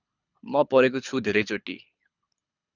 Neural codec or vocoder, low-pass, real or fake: codec, 24 kHz, 6 kbps, HILCodec; 7.2 kHz; fake